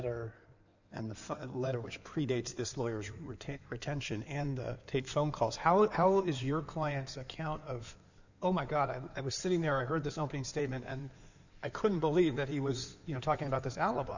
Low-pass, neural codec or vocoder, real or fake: 7.2 kHz; codec, 16 kHz in and 24 kHz out, 2.2 kbps, FireRedTTS-2 codec; fake